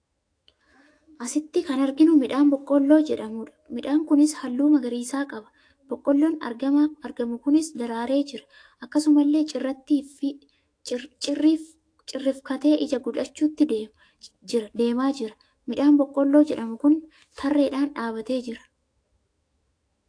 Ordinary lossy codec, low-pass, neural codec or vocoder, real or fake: AAC, 48 kbps; 9.9 kHz; autoencoder, 48 kHz, 128 numbers a frame, DAC-VAE, trained on Japanese speech; fake